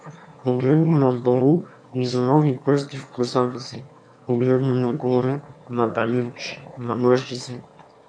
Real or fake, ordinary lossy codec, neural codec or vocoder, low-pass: fake; AAC, 64 kbps; autoencoder, 22.05 kHz, a latent of 192 numbers a frame, VITS, trained on one speaker; 9.9 kHz